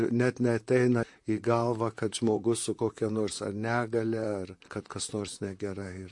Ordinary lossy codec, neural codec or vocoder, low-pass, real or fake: MP3, 48 kbps; vocoder, 44.1 kHz, 128 mel bands, Pupu-Vocoder; 10.8 kHz; fake